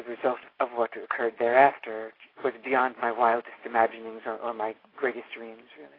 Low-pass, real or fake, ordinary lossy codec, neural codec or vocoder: 5.4 kHz; real; AAC, 24 kbps; none